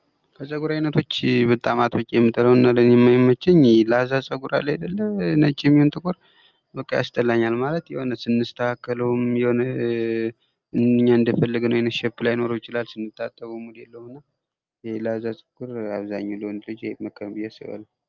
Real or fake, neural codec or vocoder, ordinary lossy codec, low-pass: real; none; Opus, 24 kbps; 7.2 kHz